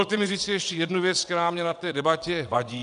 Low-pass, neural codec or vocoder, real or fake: 9.9 kHz; vocoder, 22.05 kHz, 80 mel bands, WaveNeXt; fake